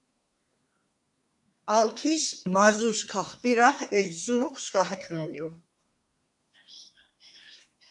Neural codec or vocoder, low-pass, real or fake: codec, 24 kHz, 1 kbps, SNAC; 10.8 kHz; fake